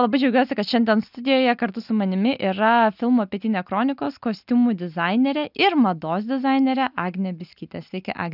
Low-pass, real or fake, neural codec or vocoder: 5.4 kHz; real; none